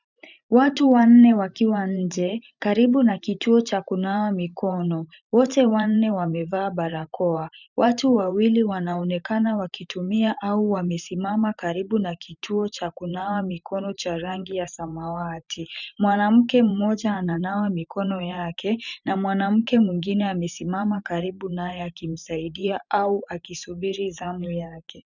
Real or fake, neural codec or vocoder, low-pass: fake; vocoder, 44.1 kHz, 128 mel bands every 512 samples, BigVGAN v2; 7.2 kHz